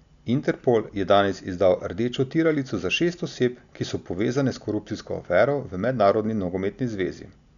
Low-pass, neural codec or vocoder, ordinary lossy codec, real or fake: 7.2 kHz; none; none; real